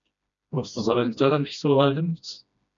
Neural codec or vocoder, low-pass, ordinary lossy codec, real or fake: codec, 16 kHz, 1 kbps, FreqCodec, smaller model; 7.2 kHz; MP3, 64 kbps; fake